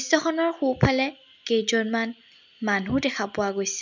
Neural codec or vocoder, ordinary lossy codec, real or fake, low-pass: none; none; real; 7.2 kHz